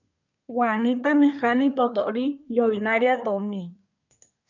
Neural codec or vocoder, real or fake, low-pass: codec, 24 kHz, 1 kbps, SNAC; fake; 7.2 kHz